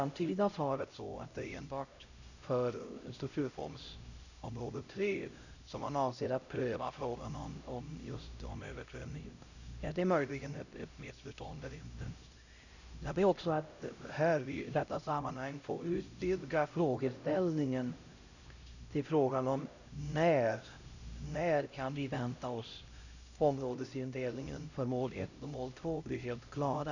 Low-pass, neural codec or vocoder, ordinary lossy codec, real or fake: 7.2 kHz; codec, 16 kHz, 0.5 kbps, X-Codec, HuBERT features, trained on LibriSpeech; none; fake